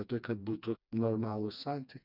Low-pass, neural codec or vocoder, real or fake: 5.4 kHz; codec, 16 kHz, 2 kbps, FreqCodec, smaller model; fake